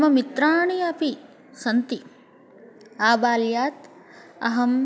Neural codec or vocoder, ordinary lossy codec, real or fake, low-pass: none; none; real; none